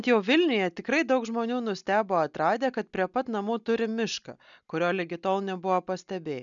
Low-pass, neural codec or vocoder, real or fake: 7.2 kHz; none; real